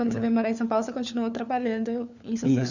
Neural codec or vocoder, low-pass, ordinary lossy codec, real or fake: codec, 16 kHz, 4 kbps, FunCodec, trained on LibriTTS, 50 frames a second; 7.2 kHz; none; fake